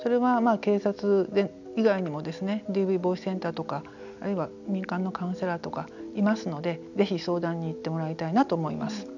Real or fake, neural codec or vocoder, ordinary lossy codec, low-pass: real; none; none; 7.2 kHz